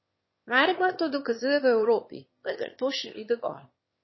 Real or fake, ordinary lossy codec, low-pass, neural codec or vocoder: fake; MP3, 24 kbps; 7.2 kHz; autoencoder, 22.05 kHz, a latent of 192 numbers a frame, VITS, trained on one speaker